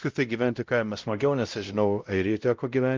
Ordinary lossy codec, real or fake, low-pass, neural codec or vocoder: Opus, 32 kbps; fake; 7.2 kHz; codec, 16 kHz, 0.5 kbps, X-Codec, WavLM features, trained on Multilingual LibriSpeech